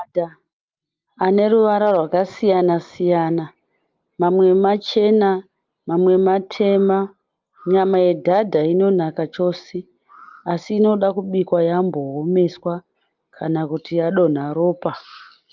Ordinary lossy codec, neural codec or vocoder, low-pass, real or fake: Opus, 24 kbps; none; 7.2 kHz; real